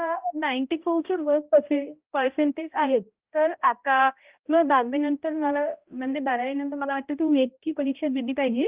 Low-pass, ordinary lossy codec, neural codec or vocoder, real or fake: 3.6 kHz; Opus, 32 kbps; codec, 16 kHz, 0.5 kbps, X-Codec, HuBERT features, trained on balanced general audio; fake